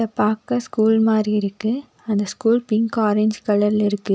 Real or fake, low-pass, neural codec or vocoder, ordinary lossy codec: real; none; none; none